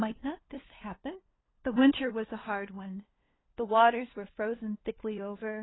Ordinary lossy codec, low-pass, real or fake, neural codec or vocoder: AAC, 16 kbps; 7.2 kHz; fake; codec, 16 kHz, 2 kbps, FunCodec, trained on LibriTTS, 25 frames a second